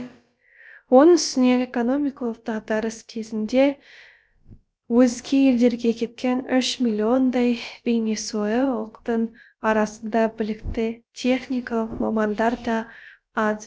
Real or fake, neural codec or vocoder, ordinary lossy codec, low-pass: fake; codec, 16 kHz, about 1 kbps, DyCAST, with the encoder's durations; none; none